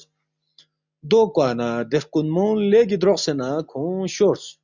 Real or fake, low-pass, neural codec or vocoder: real; 7.2 kHz; none